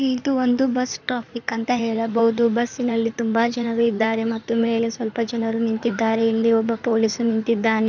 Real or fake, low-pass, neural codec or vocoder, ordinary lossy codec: fake; 7.2 kHz; codec, 16 kHz in and 24 kHz out, 2.2 kbps, FireRedTTS-2 codec; none